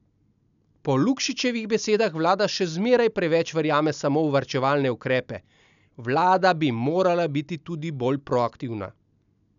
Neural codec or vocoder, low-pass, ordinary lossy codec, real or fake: none; 7.2 kHz; none; real